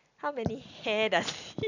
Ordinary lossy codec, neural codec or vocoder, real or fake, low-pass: none; none; real; 7.2 kHz